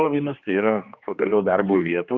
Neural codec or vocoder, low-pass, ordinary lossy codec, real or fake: codec, 16 kHz, 2 kbps, X-Codec, HuBERT features, trained on general audio; 7.2 kHz; Opus, 64 kbps; fake